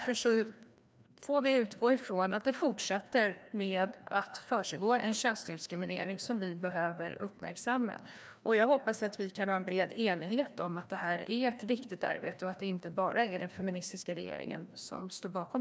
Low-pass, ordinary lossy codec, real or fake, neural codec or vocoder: none; none; fake; codec, 16 kHz, 1 kbps, FreqCodec, larger model